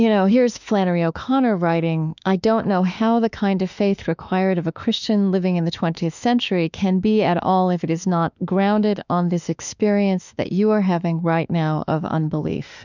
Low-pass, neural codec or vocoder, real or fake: 7.2 kHz; autoencoder, 48 kHz, 32 numbers a frame, DAC-VAE, trained on Japanese speech; fake